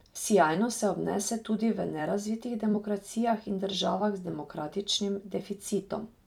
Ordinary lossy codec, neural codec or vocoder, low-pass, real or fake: none; vocoder, 44.1 kHz, 128 mel bands every 256 samples, BigVGAN v2; 19.8 kHz; fake